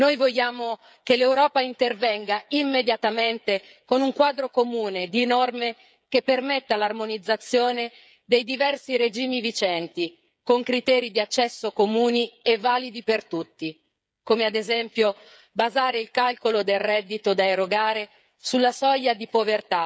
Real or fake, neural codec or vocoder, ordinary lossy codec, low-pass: fake; codec, 16 kHz, 8 kbps, FreqCodec, smaller model; none; none